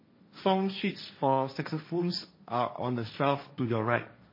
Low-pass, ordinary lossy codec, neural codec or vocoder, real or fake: 5.4 kHz; MP3, 24 kbps; codec, 16 kHz, 1.1 kbps, Voila-Tokenizer; fake